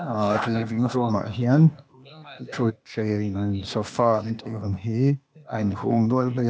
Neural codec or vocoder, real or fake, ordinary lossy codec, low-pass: codec, 16 kHz, 0.8 kbps, ZipCodec; fake; none; none